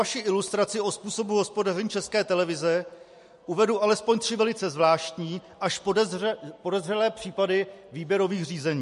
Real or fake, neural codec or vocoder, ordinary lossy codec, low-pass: real; none; MP3, 48 kbps; 14.4 kHz